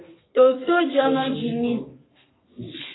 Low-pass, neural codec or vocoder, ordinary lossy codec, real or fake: 7.2 kHz; codec, 32 kHz, 1.9 kbps, SNAC; AAC, 16 kbps; fake